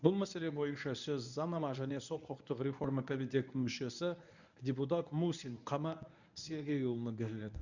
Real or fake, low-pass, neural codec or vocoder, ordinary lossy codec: fake; 7.2 kHz; codec, 24 kHz, 0.9 kbps, WavTokenizer, medium speech release version 1; none